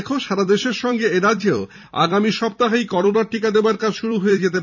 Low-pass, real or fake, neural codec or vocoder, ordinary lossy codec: 7.2 kHz; fake; vocoder, 44.1 kHz, 128 mel bands every 256 samples, BigVGAN v2; none